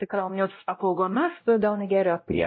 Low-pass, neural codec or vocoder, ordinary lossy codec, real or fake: 7.2 kHz; codec, 16 kHz, 0.5 kbps, X-Codec, WavLM features, trained on Multilingual LibriSpeech; MP3, 24 kbps; fake